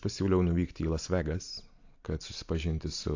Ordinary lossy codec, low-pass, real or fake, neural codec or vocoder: AAC, 48 kbps; 7.2 kHz; real; none